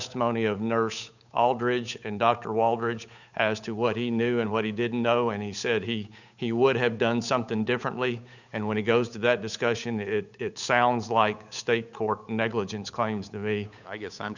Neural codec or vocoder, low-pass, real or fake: codec, 16 kHz, 8 kbps, FunCodec, trained on Chinese and English, 25 frames a second; 7.2 kHz; fake